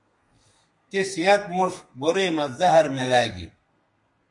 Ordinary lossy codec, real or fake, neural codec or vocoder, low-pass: MP3, 48 kbps; fake; codec, 32 kHz, 1.9 kbps, SNAC; 10.8 kHz